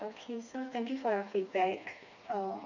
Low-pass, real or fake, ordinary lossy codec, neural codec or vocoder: 7.2 kHz; fake; none; codec, 16 kHz, 2 kbps, FreqCodec, smaller model